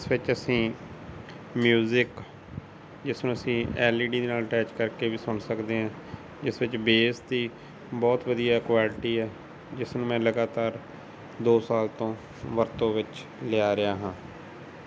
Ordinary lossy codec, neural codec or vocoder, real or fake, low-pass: none; none; real; none